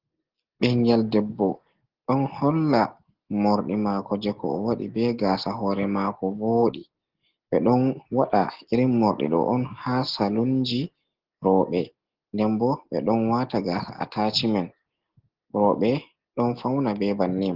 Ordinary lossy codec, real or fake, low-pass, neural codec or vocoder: Opus, 16 kbps; real; 5.4 kHz; none